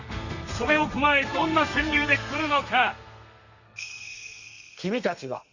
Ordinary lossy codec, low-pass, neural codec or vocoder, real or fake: Opus, 64 kbps; 7.2 kHz; codec, 32 kHz, 1.9 kbps, SNAC; fake